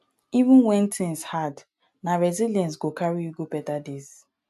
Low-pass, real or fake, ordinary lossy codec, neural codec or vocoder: 14.4 kHz; real; AAC, 96 kbps; none